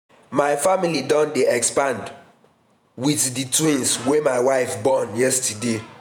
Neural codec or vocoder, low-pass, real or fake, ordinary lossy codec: vocoder, 48 kHz, 128 mel bands, Vocos; none; fake; none